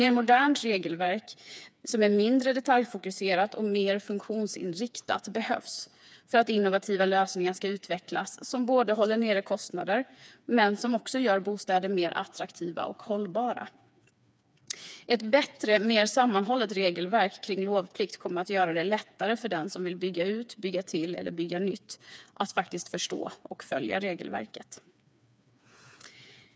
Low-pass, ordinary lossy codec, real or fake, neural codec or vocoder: none; none; fake; codec, 16 kHz, 4 kbps, FreqCodec, smaller model